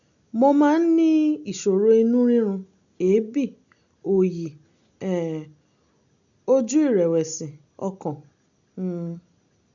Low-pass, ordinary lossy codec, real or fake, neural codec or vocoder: 7.2 kHz; none; real; none